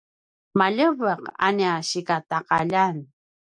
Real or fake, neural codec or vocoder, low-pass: real; none; 9.9 kHz